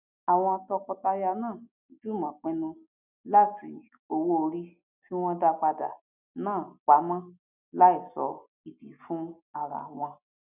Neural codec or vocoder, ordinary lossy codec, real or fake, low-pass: none; none; real; 3.6 kHz